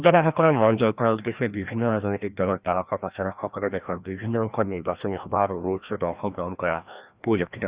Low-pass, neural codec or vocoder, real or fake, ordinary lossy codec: 3.6 kHz; codec, 16 kHz, 1 kbps, FreqCodec, larger model; fake; Opus, 64 kbps